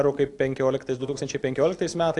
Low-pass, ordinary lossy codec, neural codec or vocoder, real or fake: 10.8 kHz; AAC, 64 kbps; none; real